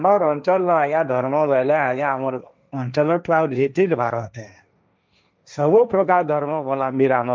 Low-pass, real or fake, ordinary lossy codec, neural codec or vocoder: none; fake; none; codec, 16 kHz, 1.1 kbps, Voila-Tokenizer